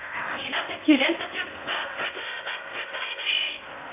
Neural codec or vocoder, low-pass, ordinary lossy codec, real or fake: codec, 16 kHz in and 24 kHz out, 0.6 kbps, FocalCodec, streaming, 4096 codes; 3.6 kHz; AAC, 24 kbps; fake